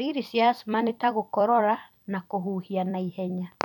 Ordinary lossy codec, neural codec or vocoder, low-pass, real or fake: none; vocoder, 44.1 kHz, 128 mel bands every 256 samples, BigVGAN v2; 19.8 kHz; fake